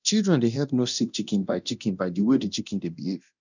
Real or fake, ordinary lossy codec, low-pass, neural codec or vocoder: fake; none; 7.2 kHz; codec, 24 kHz, 0.9 kbps, DualCodec